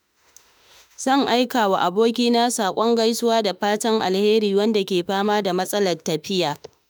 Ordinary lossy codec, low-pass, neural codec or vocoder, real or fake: none; none; autoencoder, 48 kHz, 32 numbers a frame, DAC-VAE, trained on Japanese speech; fake